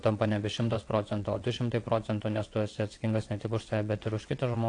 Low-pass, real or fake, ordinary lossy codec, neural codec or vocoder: 9.9 kHz; fake; AAC, 48 kbps; vocoder, 22.05 kHz, 80 mel bands, WaveNeXt